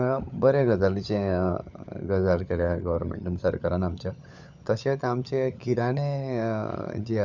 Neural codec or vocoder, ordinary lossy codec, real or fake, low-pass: codec, 16 kHz, 16 kbps, FunCodec, trained on LibriTTS, 50 frames a second; none; fake; 7.2 kHz